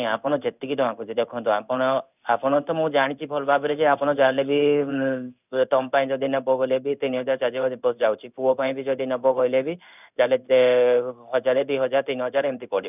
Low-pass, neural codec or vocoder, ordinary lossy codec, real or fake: 3.6 kHz; codec, 16 kHz in and 24 kHz out, 1 kbps, XY-Tokenizer; none; fake